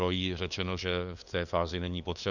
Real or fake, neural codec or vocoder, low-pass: fake; codec, 16 kHz, 2 kbps, FunCodec, trained on LibriTTS, 25 frames a second; 7.2 kHz